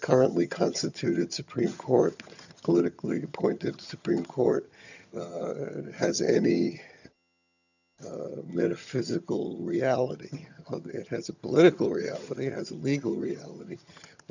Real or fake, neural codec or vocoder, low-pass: fake; vocoder, 22.05 kHz, 80 mel bands, HiFi-GAN; 7.2 kHz